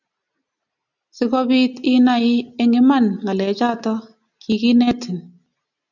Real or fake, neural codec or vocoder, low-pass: real; none; 7.2 kHz